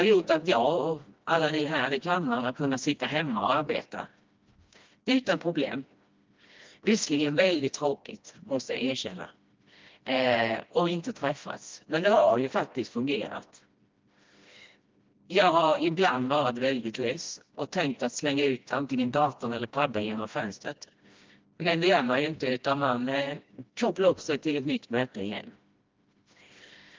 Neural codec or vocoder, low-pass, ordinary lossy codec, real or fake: codec, 16 kHz, 1 kbps, FreqCodec, smaller model; 7.2 kHz; Opus, 24 kbps; fake